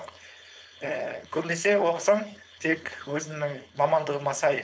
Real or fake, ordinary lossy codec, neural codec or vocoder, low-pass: fake; none; codec, 16 kHz, 4.8 kbps, FACodec; none